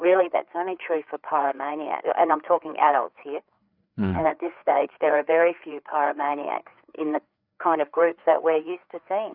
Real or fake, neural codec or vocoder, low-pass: fake; codec, 16 kHz, 4 kbps, FreqCodec, larger model; 5.4 kHz